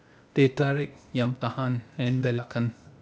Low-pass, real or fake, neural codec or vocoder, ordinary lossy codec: none; fake; codec, 16 kHz, 0.8 kbps, ZipCodec; none